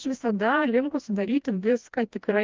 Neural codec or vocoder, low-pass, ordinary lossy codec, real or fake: codec, 16 kHz, 1 kbps, FreqCodec, smaller model; 7.2 kHz; Opus, 24 kbps; fake